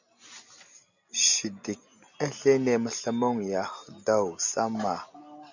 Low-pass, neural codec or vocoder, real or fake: 7.2 kHz; none; real